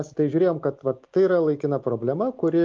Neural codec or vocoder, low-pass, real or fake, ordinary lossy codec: none; 7.2 kHz; real; Opus, 24 kbps